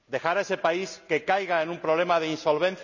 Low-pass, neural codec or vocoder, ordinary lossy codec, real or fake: 7.2 kHz; none; none; real